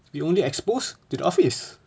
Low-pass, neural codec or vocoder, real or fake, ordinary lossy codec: none; none; real; none